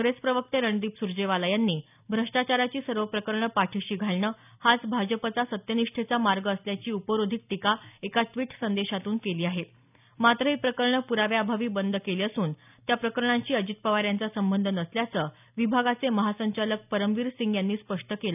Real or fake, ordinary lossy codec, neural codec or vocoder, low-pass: real; none; none; 3.6 kHz